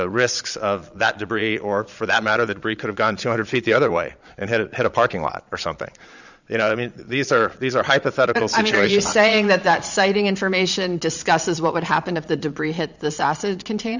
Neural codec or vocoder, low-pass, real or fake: vocoder, 22.05 kHz, 80 mel bands, Vocos; 7.2 kHz; fake